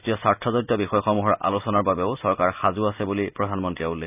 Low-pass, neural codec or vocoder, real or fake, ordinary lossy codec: 3.6 kHz; none; real; none